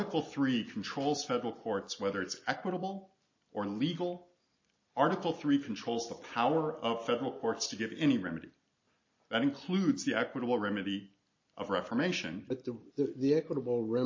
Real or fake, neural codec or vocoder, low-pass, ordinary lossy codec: real; none; 7.2 kHz; MP3, 32 kbps